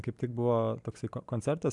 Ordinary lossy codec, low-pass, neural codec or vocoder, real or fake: MP3, 96 kbps; 10.8 kHz; none; real